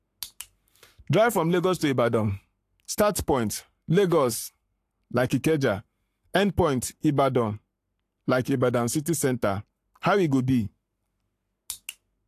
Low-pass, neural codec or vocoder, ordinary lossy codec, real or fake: 14.4 kHz; codec, 44.1 kHz, 7.8 kbps, Pupu-Codec; AAC, 64 kbps; fake